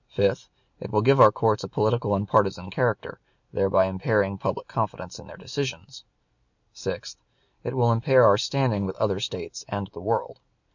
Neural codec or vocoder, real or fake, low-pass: none; real; 7.2 kHz